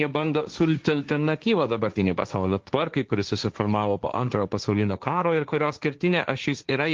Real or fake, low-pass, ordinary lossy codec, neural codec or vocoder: fake; 7.2 kHz; Opus, 32 kbps; codec, 16 kHz, 1.1 kbps, Voila-Tokenizer